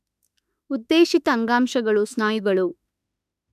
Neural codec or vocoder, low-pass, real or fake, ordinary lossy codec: autoencoder, 48 kHz, 32 numbers a frame, DAC-VAE, trained on Japanese speech; 14.4 kHz; fake; none